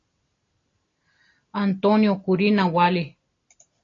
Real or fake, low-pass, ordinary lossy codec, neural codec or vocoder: real; 7.2 kHz; AAC, 32 kbps; none